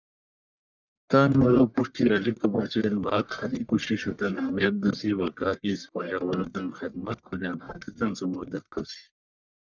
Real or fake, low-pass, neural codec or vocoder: fake; 7.2 kHz; codec, 44.1 kHz, 1.7 kbps, Pupu-Codec